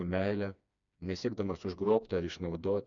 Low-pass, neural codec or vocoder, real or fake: 7.2 kHz; codec, 16 kHz, 2 kbps, FreqCodec, smaller model; fake